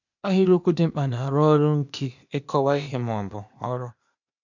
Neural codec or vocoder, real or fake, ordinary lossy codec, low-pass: codec, 16 kHz, 0.8 kbps, ZipCodec; fake; none; 7.2 kHz